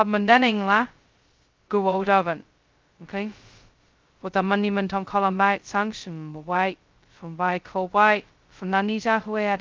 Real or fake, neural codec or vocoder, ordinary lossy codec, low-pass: fake; codec, 16 kHz, 0.2 kbps, FocalCodec; Opus, 32 kbps; 7.2 kHz